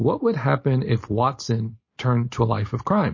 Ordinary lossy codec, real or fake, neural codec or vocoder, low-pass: MP3, 32 kbps; real; none; 7.2 kHz